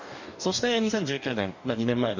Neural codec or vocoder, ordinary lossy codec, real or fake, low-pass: codec, 44.1 kHz, 2.6 kbps, DAC; none; fake; 7.2 kHz